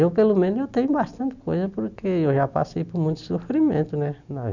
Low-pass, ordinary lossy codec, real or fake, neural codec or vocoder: 7.2 kHz; none; real; none